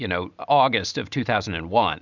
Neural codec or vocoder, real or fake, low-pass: none; real; 7.2 kHz